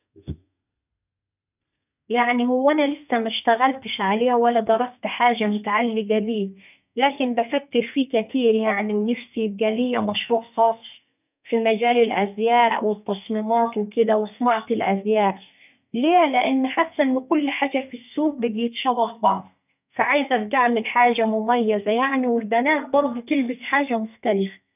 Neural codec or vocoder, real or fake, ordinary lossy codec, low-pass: codec, 32 kHz, 1.9 kbps, SNAC; fake; none; 3.6 kHz